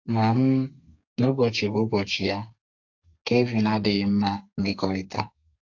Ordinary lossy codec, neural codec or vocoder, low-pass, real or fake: AAC, 48 kbps; codec, 32 kHz, 1.9 kbps, SNAC; 7.2 kHz; fake